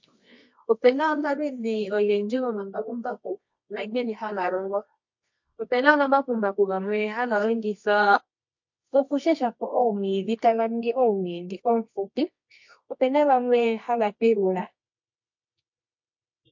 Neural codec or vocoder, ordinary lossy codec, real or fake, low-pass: codec, 24 kHz, 0.9 kbps, WavTokenizer, medium music audio release; MP3, 48 kbps; fake; 7.2 kHz